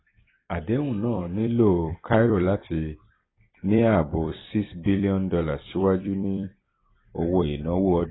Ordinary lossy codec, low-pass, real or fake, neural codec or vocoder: AAC, 16 kbps; 7.2 kHz; fake; vocoder, 44.1 kHz, 128 mel bands every 256 samples, BigVGAN v2